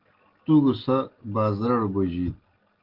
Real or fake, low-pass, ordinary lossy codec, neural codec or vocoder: real; 5.4 kHz; Opus, 16 kbps; none